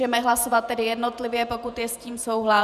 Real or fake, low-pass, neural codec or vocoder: fake; 14.4 kHz; vocoder, 48 kHz, 128 mel bands, Vocos